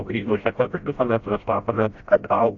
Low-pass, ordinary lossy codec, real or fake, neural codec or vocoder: 7.2 kHz; AAC, 48 kbps; fake; codec, 16 kHz, 0.5 kbps, FreqCodec, smaller model